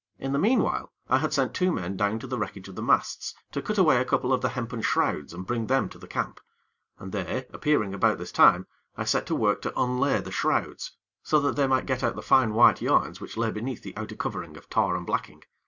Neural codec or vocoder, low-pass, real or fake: none; 7.2 kHz; real